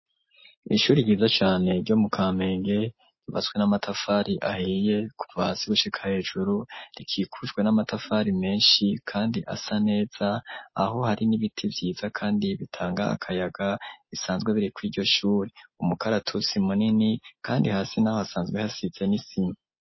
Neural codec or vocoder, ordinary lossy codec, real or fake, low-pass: none; MP3, 24 kbps; real; 7.2 kHz